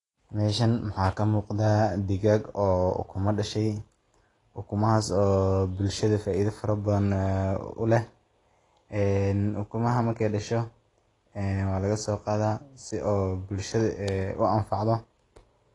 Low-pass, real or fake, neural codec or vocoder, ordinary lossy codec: 10.8 kHz; real; none; AAC, 32 kbps